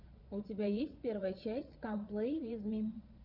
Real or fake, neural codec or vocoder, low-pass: fake; vocoder, 22.05 kHz, 80 mel bands, Vocos; 5.4 kHz